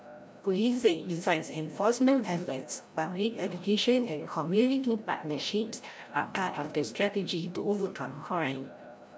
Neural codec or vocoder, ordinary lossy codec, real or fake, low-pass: codec, 16 kHz, 0.5 kbps, FreqCodec, larger model; none; fake; none